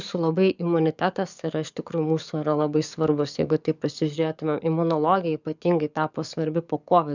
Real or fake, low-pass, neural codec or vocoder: fake; 7.2 kHz; codec, 44.1 kHz, 7.8 kbps, DAC